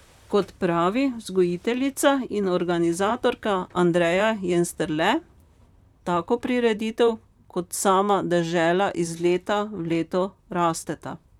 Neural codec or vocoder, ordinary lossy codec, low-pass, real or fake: vocoder, 44.1 kHz, 128 mel bands, Pupu-Vocoder; none; 19.8 kHz; fake